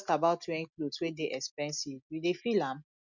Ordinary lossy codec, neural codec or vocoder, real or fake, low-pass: none; none; real; 7.2 kHz